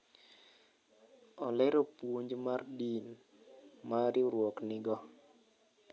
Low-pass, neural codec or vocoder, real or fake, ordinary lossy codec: none; none; real; none